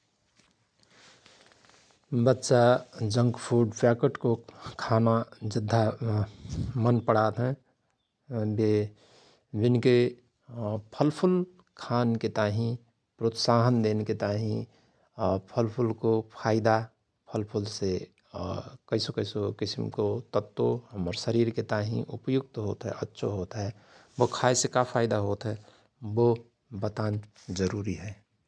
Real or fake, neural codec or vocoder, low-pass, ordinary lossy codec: real; none; none; none